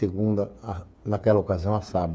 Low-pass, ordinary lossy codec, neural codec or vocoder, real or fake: none; none; codec, 16 kHz, 8 kbps, FreqCodec, smaller model; fake